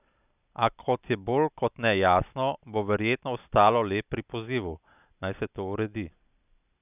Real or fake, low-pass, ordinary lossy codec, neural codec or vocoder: real; 3.6 kHz; none; none